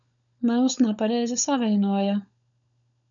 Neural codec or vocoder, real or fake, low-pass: codec, 16 kHz, 16 kbps, FunCodec, trained on LibriTTS, 50 frames a second; fake; 7.2 kHz